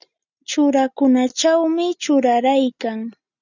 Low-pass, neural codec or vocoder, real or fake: 7.2 kHz; none; real